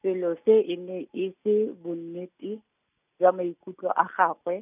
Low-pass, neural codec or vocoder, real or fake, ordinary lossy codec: 3.6 kHz; none; real; none